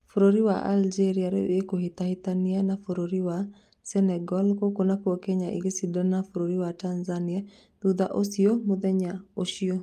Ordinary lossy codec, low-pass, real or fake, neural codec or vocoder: Opus, 32 kbps; 14.4 kHz; real; none